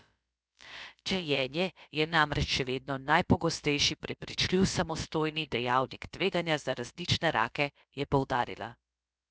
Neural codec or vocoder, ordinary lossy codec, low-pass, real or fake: codec, 16 kHz, about 1 kbps, DyCAST, with the encoder's durations; none; none; fake